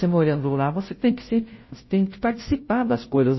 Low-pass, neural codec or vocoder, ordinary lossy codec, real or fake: 7.2 kHz; codec, 16 kHz, 0.5 kbps, FunCodec, trained on Chinese and English, 25 frames a second; MP3, 24 kbps; fake